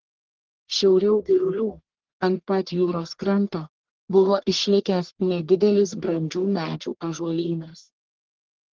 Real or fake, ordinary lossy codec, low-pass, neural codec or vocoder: fake; Opus, 16 kbps; 7.2 kHz; codec, 44.1 kHz, 1.7 kbps, Pupu-Codec